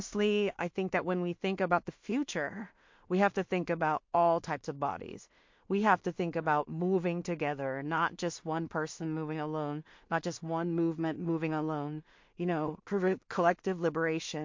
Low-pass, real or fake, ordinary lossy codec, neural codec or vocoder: 7.2 kHz; fake; MP3, 48 kbps; codec, 16 kHz in and 24 kHz out, 0.4 kbps, LongCat-Audio-Codec, two codebook decoder